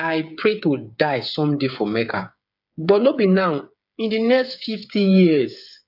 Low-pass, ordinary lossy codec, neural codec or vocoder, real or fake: 5.4 kHz; AAC, 48 kbps; codec, 16 kHz, 8 kbps, FreqCodec, smaller model; fake